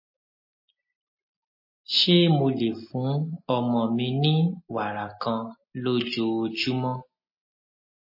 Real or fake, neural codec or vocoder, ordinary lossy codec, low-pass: real; none; MP3, 24 kbps; 5.4 kHz